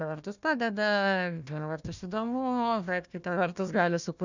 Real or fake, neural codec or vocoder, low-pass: fake; codec, 16 kHz, 1 kbps, FunCodec, trained on Chinese and English, 50 frames a second; 7.2 kHz